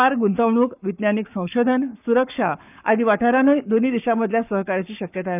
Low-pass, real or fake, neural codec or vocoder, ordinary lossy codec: 3.6 kHz; fake; codec, 44.1 kHz, 7.8 kbps, Pupu-Codec; none